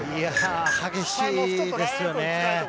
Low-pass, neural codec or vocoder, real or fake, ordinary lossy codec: none; none; real; none